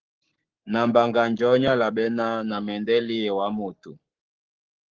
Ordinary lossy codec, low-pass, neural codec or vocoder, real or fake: Opus, 16 kbps; 7.2 kHz; codec, 44.1 kHz, 7.8 kbps, Pupu-Codec; fake